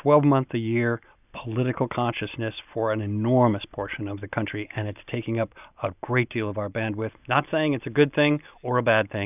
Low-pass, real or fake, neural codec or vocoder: 3.6 kHz; real; none